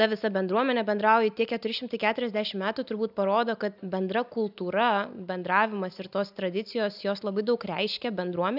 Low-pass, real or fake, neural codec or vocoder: 5.4 kHz; real; none